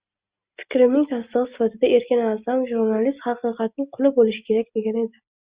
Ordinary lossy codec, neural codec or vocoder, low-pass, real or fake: Opus, 64 kbps; none; 3.6 kHz; real